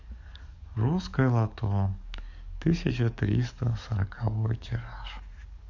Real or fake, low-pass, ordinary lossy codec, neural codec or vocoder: fake; 7.2 kHz; none; codec, 44.1 kHz, 7.8 kbps, DAC